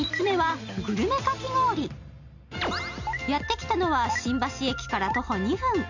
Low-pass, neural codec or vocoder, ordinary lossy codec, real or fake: 7.2 kHz; none; none; real